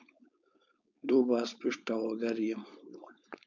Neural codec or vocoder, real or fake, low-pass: codec, 16 kHz, 4.8 kbps, FACodec; fake; 7.2 kHz